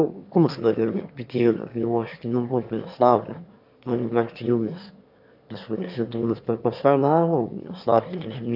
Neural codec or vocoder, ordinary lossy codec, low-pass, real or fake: autoencoder, 22.05 kHz, a latent of 192 numbers a frame, VITS, trained on one speaker; AAC, 48 kbps; 5.4 kHz; fake